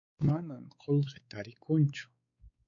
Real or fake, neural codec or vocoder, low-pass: fake; codec, 16 kHz, 4 kbps, X-Codec, WavLM features, trained on Multilingual LibriSpeech; 7.2 kHz